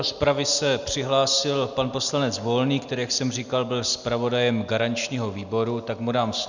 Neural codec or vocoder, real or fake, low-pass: none; real; 7.2 kHz